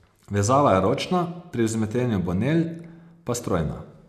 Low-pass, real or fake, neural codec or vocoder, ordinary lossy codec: 14.4 kHz; real; none; none